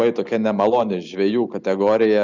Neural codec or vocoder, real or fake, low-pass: none; real; 7.2 kHz